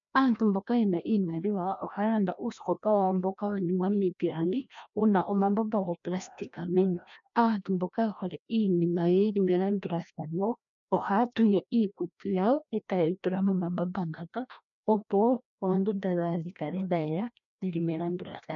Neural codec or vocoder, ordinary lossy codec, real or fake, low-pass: codec, 16 kHz, 1 kbps, FreqCodec, larger model; MP3, 64 kbps; fake; 7.2 kHz